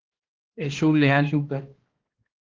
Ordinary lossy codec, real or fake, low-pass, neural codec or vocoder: Opus, 16 kbps; fake; 7.2 kHz; codec, 16 kHz, 1 kbps, X-Codec, HuBERT features, trained on balanced general audio